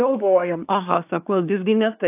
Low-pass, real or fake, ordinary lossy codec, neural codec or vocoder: 3.6 kHz; fake; AAC, 32 kbps; codec, 24 kHz, 1 kbps, SNAC